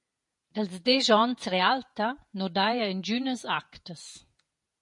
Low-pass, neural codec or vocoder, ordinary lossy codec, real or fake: 10.8 kHz; vocoder, 48 kHz, 128 mel bands, Vocos; MP3, 48 kbps; fake